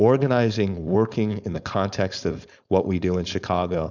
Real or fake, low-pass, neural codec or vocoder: fake; 7.2 kHz; codec, 16 kHz, 4.8 kbps, FACodec